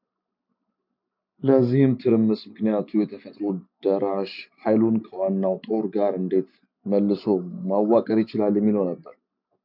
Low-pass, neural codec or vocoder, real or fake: 5.4 kHz; none; real